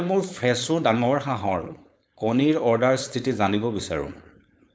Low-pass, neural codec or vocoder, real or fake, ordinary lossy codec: none; codec, 16 kHz, 4.8 kbps, FACodec; fake; none